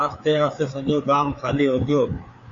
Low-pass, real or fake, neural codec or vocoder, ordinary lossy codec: 7.2 kHz; fake; codec, 16 kHz, 4 kbps, FreqCodec, larger model; MP3, 48 kbps